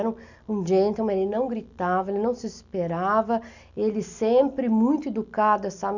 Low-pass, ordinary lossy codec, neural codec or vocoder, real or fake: 7.2 kHz; none; none; real